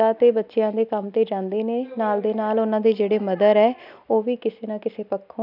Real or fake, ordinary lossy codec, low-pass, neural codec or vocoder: real; none; 5.4 kHz; none